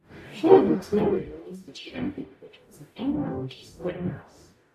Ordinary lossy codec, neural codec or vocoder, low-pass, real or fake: MP3, 64 kbps; codec, 44.1 kHz, 0.9 kbps, DAC; 14.4 kHz; fake